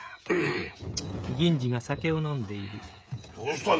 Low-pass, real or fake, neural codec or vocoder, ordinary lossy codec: none; fake; codec, 16 kHz, 16 kbps, FreqCodec, smaller model; none